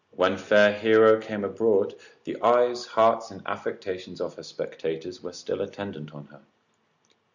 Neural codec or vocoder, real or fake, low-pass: none; real; 7.2 kHz